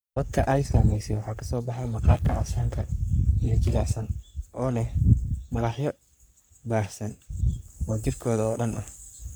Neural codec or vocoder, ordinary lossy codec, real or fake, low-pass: codec, 44.1 kHz, 3.4 kbps, Pupu-Codec; none; fake; none